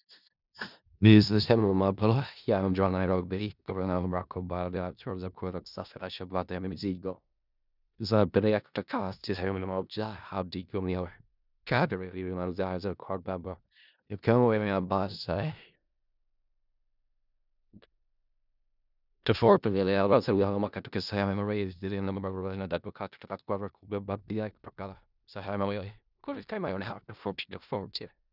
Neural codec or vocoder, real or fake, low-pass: codec, 16 kHz in and 24 kHz out, 0.4 kbps, LongCat-Audio-Codec, four codebook decoder; fake; 5.4 kHz